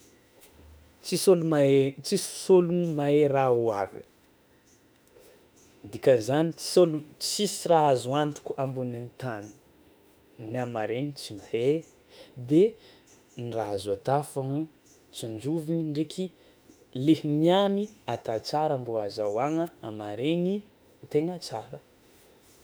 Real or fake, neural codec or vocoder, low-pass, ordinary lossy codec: fake; autoencoder, 48 kHz, 32 numbers a frame, DAC-VAE, trained on Japanese speech; none; none